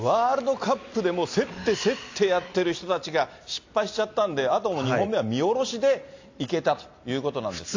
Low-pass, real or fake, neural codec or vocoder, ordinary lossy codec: 7.2 kHz; real; none; AAC, 48 kbps